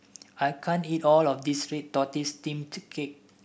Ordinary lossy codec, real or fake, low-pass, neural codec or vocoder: none; real; none; none